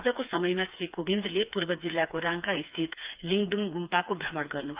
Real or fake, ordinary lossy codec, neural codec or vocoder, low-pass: fake; Opus, 24 kbps; codec, 16 kHz, 4 kbps, FreqCodec, smaller model; 3.6 kHz